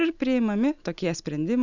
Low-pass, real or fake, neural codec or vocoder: 7.2 kHz; real; none